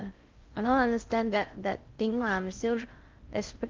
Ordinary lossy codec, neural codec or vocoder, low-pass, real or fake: Opus, 24 kbps; codec, 16 kHz in and 24 kHz out, 0.6 kbps, FocalCodec, streaming, 4096 codes; 7.2 kHz; fake